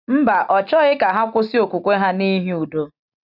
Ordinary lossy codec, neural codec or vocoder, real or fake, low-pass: none; none; real; 5.4 kHz